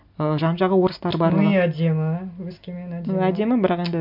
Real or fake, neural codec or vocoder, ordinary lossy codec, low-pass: real; none; MP3, 48 kbps; 5.4 kHz